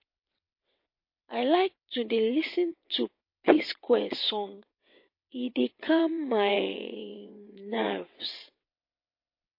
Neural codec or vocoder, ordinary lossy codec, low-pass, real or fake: vocoder, 22.05 kHz, 80 mel bands, WaveNeXt; MP3, 32 kbps; 5.4 kHz; fake